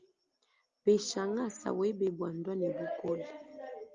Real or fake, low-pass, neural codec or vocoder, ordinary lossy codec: real; 7.2 kHz; none; Opus, 32 kbps